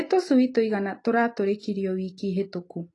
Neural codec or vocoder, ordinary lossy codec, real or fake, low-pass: none; AAC, 32 kbps; real; 10.8 kHz